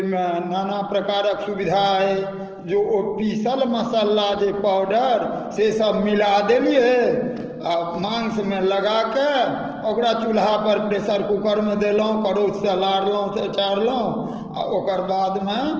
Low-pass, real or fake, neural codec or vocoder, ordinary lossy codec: 7.2 kHz; real; none; Opus, 16 kbps